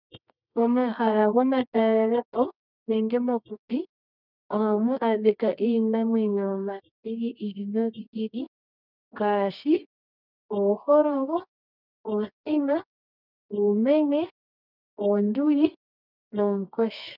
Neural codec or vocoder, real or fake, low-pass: codec, 24 kHz, 0.9 kbps, WavTokenizer, medium music audio release; fake; 5.4 kHz